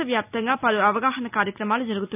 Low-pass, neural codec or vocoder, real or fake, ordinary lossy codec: 3.6 kHz; none; real; none